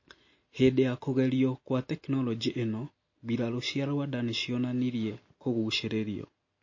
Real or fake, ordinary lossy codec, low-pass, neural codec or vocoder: real; MP3, 32 kbps; 7.2 kHz; none